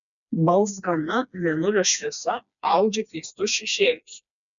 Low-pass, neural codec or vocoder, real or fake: 7.2 kHz; codec, 16 kHz, 2 kbps, FreqCodec, smaller model; fake